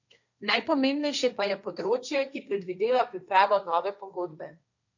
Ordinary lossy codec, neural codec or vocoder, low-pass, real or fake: none; codec, 16 kHz, 1.1 kbps, Voila-Tokenizer; 7.2 kHz; fake